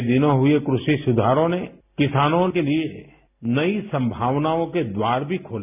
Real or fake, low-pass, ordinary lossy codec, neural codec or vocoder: real; 3.6 kHz; none; none